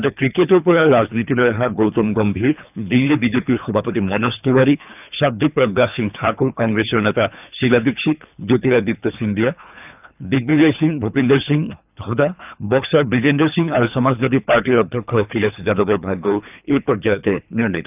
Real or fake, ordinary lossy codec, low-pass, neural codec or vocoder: fake; none; 3.6 kHz; codec, 24 kHz, 3 kbps, HILCodec